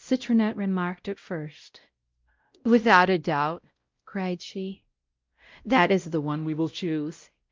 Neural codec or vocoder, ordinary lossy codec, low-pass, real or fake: codec, 16 kHz, 0.5 kbps, X-Codec, WavLM features, trained on Multilingual LibriSpeech; Opus, 24 kbps; 7.2 kHz; fake